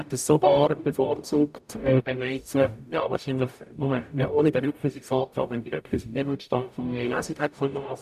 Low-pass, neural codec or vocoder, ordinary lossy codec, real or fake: 14.4 kHz; codec, 44.1 kHz, 0.9 kbps, DAC; none; fake